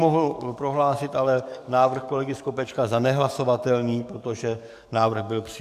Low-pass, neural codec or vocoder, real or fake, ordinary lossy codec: 14.4 kHz; codec, 44.1 kHz, 7.8 kbps, DAC; fake; MP3, 96 kbps